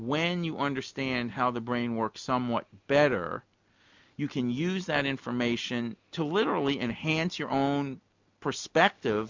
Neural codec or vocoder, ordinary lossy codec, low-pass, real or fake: none; MP3, 64 kbps; 7.2 kHz; real